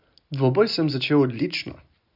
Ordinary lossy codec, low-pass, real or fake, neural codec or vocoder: none; 5.4 kHz; real; none